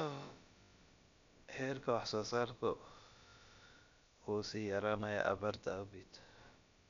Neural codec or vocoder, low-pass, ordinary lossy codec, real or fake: codec, 16 kHz, about 1 kbps, DyCAST, with the encoder's durations; 7.2 kHz; MP3, 64 kbps; fake